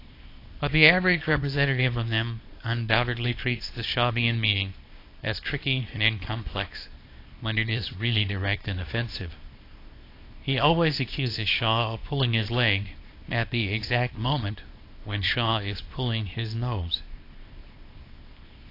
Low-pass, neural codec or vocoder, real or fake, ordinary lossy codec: 5.4 kHz; codec, 24 kHz, 0.9 kbps, WavTokenizer, small release; fake; AAC, 32 kbps